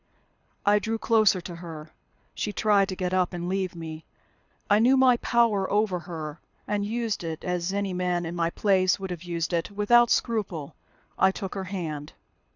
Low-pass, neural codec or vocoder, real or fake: 7.2 kHz; codec, 24 kHz, 6 kbps, HILCodec; fake